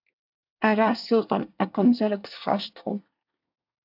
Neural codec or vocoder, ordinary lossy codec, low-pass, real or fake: codec, 24 kHz, 1 kbps, SNAC; AAC, 48 kbps; 5.4 kHz; fake